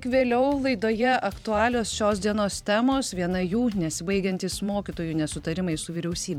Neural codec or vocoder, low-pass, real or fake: vocoder, 44.1 kHz, 128 mel bands every 512 samples, BigVGAN v2; 19.8 kHz; fake